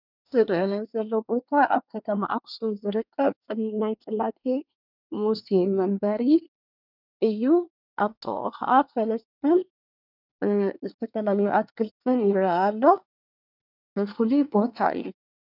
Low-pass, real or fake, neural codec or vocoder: 5.4 kHz; fake; codec, 24 kHz, 1 kbps, SNAC